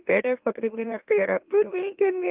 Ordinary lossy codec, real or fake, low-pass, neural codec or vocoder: Opus, 32 kbps; fake; 3.6 kHz; autoencoder, 44.1 kHz, a latent of 192 numbers a frame, MeloTTS